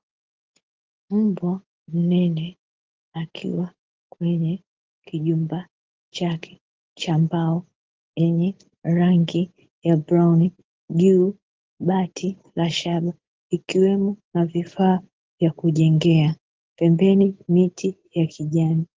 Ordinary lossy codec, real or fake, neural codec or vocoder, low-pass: Opus, 16 kbps; real; none; 7.2 kHz